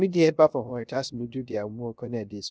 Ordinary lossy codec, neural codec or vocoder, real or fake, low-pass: none; codec, 16 kHz, 0.3 kbps, FocalCodec; fake; none